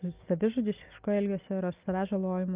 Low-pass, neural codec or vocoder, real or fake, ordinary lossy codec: 3.6 kHz; none; real; Opus, 24 kbps